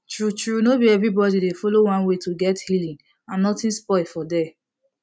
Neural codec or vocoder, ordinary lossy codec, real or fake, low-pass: none; none; real; none